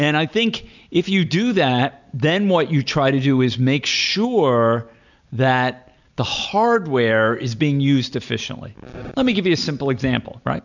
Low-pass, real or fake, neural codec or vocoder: 7.2 kHz; real; none